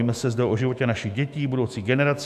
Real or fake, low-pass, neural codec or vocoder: fake; 14.4 kHz; autoencoder, 48 kHz, 128 numbers a frame, DAC-VAE, trained on Japanese speech